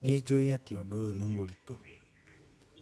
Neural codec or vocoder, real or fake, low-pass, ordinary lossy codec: codec, 24 kHz, 0.9 kbps, WavTokenizer, medium music audio release; fake; none; none